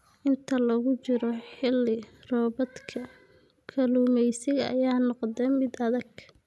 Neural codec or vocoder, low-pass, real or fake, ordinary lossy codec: none; none; real; none